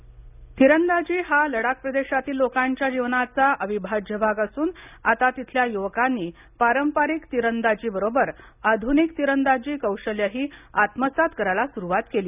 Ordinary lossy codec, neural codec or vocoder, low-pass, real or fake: none; none; 3.6 kHz; real